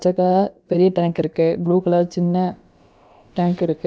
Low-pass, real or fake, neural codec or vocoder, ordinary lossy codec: none; fake; codec, 16 kHz, about 1 kbps, DyCAST, with the encoder's durations; none